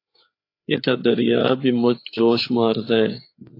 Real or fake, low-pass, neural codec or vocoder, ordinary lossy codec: fake; 5.4 kHz; codec, 16 kHz, 4 kbps, FreqCodec, larger model; AAC, 32 kbps